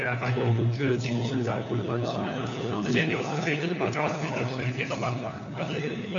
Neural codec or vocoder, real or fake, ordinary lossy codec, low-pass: codec, 16 kHz, 4 kbps, FunCodec, trained on LibriTTS, 50 frames a second; fake; MP3, 64 kbps; 7.2 kHz